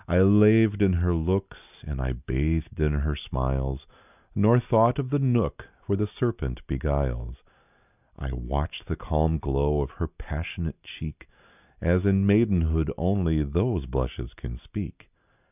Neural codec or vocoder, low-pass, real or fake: none; 3.6 kHz; real